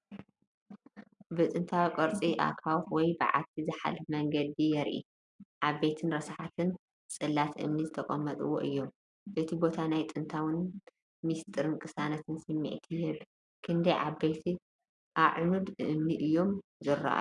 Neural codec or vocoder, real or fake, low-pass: none; real; 10.8 kHz